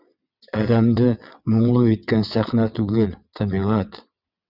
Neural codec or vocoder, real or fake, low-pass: vocoder, 22.05 kHz, 80 mel bands, WaveNeXt; fake; 5.4 kHz